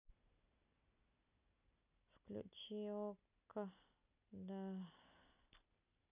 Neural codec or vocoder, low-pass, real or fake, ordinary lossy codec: none; 3.6 kHz; real; none